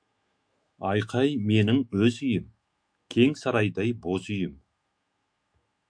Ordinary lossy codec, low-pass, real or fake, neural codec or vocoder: MP3, 64 kbps; 9.9 kHz; fake; autoencoder, 48 kHz, 128 numbers a frame, DAC-VAE, trained on Japanese speech